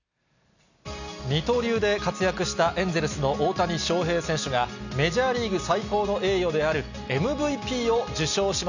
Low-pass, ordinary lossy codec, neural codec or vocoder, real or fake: 7.2 kHz; none; none; real